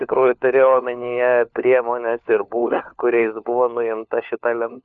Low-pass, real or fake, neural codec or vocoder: 7.2 kHz; fake; codec, 16 kHz, 4 kbps, FunCodec, trained on LibriTTS, 50 frames a second